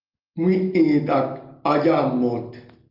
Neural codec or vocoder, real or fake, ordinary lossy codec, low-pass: none; real; Opus, 32 kbps; 5.4 kHz